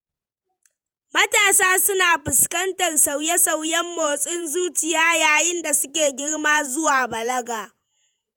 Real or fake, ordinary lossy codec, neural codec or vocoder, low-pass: fake; none; vocoder, 48 kHz, 128 mel bands, Vocos; none